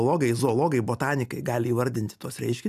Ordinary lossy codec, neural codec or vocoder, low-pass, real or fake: AAC, 96 kbps; none; 14.4 kHz; real